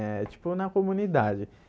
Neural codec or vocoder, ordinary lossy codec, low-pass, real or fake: none; none; none; real